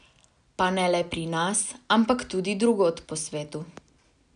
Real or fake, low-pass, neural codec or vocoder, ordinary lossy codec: real; 9.9 kHz; none; none